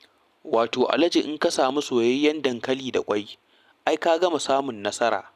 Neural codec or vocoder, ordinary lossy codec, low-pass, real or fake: none; none; 14.4 kHz; real